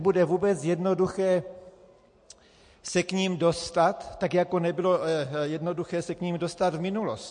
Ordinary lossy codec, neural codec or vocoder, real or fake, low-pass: MP3, 48 kbps; none; real; 9.9 kHz